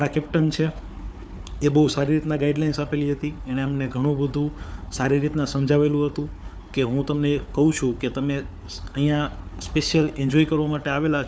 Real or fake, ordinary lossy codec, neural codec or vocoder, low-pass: fake; none; codec, 16 kHz, 4 kbps, FunCodec, trained on Chinese and English, 50 frames a second; none